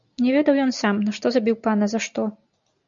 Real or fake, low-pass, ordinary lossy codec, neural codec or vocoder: real; 7.2 kHz; MP3, 96 kbps; none